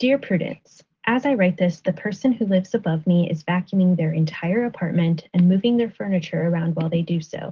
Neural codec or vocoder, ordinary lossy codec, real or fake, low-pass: none; Opus, 16 kbps; real; 7.2 kHz